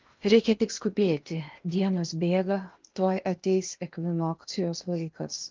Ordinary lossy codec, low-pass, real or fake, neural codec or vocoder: Opus, 32 kbps; 7.2 kHz; fake; codec, 16 kHz in and 24 kHz out, 0.8 kbps, FocalCodec, streaming, 65536 codes